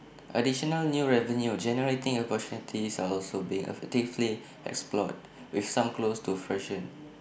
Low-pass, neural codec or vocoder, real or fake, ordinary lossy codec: none; none; real; none